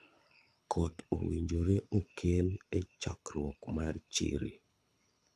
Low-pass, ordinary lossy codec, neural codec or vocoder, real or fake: none; none; codec, 24 kHz, 6 kbps, HILCodec; fake